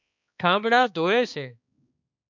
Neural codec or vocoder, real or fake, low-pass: codec, 16 kHz, 2 kbps, X-Codec, HuBERT features, trained on balanced general audio; fake; 7.2 kHz